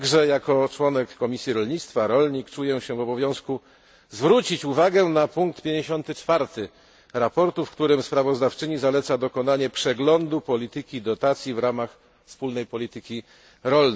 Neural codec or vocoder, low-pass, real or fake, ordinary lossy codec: none; none; real; none